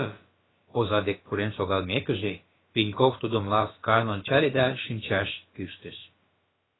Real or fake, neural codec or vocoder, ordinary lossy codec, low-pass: fake; codec, 16 kHz, about 1 kbps, DyCAST, with the encoder's durations; AAC, 16 kbps; 7.2 kHz